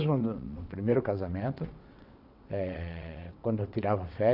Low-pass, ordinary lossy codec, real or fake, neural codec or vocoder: 5.4 kHz; none; fake; vocoder, 22.05 kHz, 80 mel bands, WaveNeXt